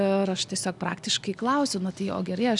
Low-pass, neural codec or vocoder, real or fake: 10.8 kHz; none; real